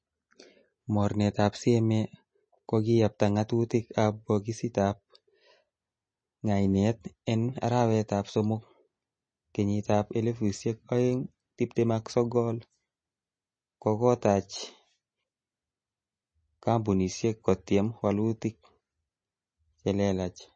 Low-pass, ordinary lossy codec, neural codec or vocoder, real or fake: 9.9 kHz; MP3, 32 kbps; none; real